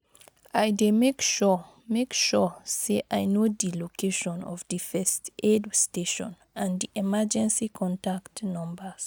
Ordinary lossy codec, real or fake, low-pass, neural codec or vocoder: none; real; none; none